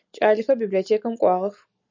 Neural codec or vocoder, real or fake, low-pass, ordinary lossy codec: none; real; 7.2 kHz; MP3, 64 kbps